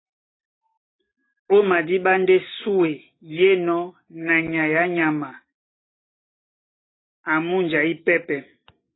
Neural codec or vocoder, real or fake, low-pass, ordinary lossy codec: none; real; 7.2 kHz; AAC, 16 kbps